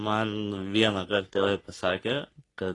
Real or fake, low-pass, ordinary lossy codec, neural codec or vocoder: fake; 10.8 kHz; AAC, 32 kbps; codec, 44.1 kHz, 3.4 kbps, Pupu-Codec